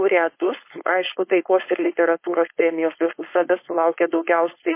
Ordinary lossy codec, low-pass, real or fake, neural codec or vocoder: MP3, 24 kbps; 3.6 kHz; fake; codec, 16 kHz, 4.8 kbps, FACodec